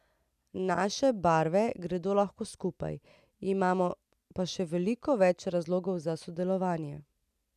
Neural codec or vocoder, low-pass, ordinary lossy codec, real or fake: none; 14.4 kHz; none; real